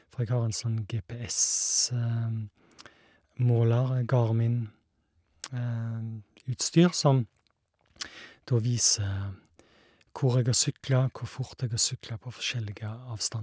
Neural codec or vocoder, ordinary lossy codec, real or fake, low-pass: none; none; real; none